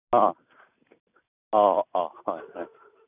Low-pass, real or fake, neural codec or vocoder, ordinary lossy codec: 3.6 kHz; real; none; none